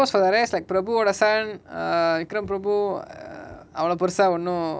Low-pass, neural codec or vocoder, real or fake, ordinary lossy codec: none; none; real; none